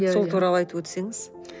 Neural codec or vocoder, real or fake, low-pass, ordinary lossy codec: none; real; none; none